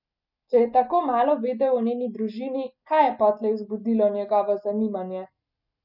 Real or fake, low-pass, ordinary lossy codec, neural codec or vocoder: real; 5.4 kHz; none; none